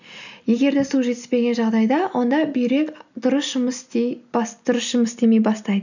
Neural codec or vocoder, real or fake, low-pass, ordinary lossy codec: none; real; 7.2 kHz; none